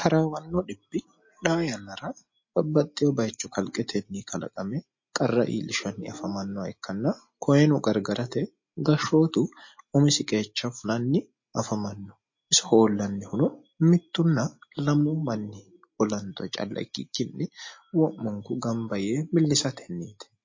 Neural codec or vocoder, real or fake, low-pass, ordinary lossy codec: none; real; 7.2 kHz; MP3, 32 kbps